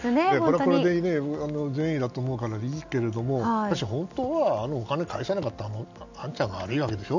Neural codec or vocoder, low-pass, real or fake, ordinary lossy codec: none; 7.2 kHz; real; none